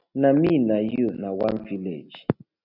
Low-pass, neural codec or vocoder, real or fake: 5.4 kHz; none; real